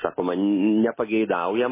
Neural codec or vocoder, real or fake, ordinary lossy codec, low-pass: none; real; MP3, 16 kbps; 3.6 kHz